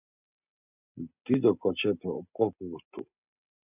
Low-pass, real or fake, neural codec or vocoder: 3.6 kHz; real; none